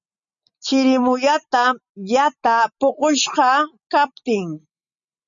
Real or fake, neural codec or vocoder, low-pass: real; none; 7.2 kHz